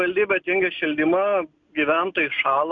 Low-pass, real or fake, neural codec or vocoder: 7.2 kHz; real; none